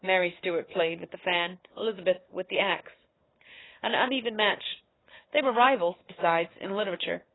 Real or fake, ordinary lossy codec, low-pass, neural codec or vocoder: fake; AAC, 16 kbps; 7.2 kHz; codec, 16 kHz, 4 kbps, FunCodec, trained on Chinese and English, 50 frames a second